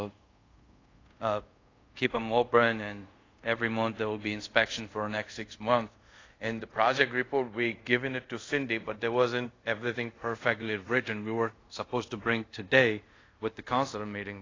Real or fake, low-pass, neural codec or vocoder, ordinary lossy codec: fake; 7.2 kHz; codec, 24 kHz, 0.5 kbps, DualCodec; AAC, 32 kbps